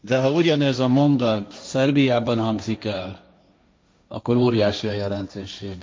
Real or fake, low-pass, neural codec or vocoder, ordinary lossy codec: fake; none; codec, 16 kHz, 1.1 kbps, Voila-Tokenizer; none